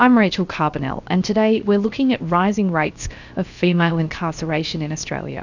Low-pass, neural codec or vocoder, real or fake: 7.2 kHz; codec, 16 kHz, 0.7 kbps, FocalCodec; fake